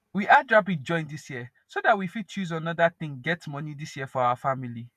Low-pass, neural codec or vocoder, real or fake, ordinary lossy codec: 14.4 kHz; vocoder, 48 kHz, 128 mel bands, Vocos; fake; none